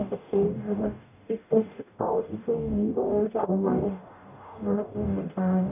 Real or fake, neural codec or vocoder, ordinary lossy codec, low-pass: fake; codec, 44.1 kHz, 0.9 kbps, DAC; none; 3.6 kHz